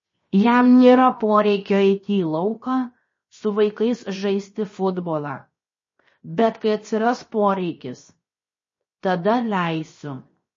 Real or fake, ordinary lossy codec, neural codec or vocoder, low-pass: fake; MP3, 32 kbps; codec, 16 kHz, 0.7 kbps, FocalCodec; 7.2 kHz